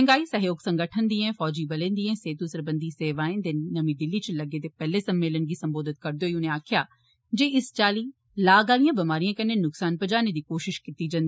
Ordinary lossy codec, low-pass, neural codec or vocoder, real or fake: none; none; none; real